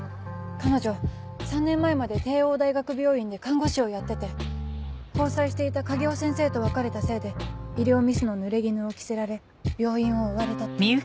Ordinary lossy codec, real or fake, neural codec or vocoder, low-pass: none; real; none; none